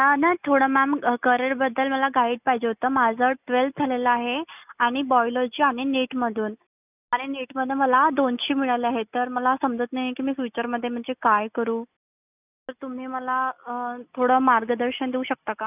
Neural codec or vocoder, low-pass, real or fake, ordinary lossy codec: none; 3.6 kHz; real; none